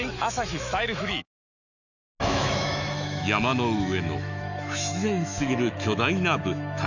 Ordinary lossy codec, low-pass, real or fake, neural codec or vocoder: none; 7.2 kHz; fake; autoencoder, 48 kHz, 128 numbers a frame, DAC-VAE, trained on Japanese speech